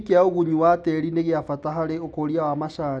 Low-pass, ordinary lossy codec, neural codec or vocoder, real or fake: none; none; none; real